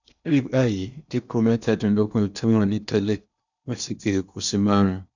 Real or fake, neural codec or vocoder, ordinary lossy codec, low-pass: fake; codec, 16 kHz in and 24 kHz out, 0.8 kbps, FocalCodec, streaming, 65536 codes; none; 7.2 kHz